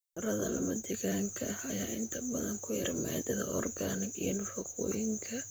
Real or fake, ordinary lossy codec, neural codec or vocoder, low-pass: fake; none; vocoder, 44.1 kHz, 128 mel bands, Pupu-Vocoder; none